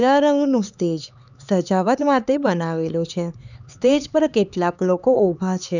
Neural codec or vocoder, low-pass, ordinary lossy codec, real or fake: codec, 16 kHz, 4 kbps, X-Codec, HuBERT features, trained on LibriSpeech; 7.2 kHz; none; fake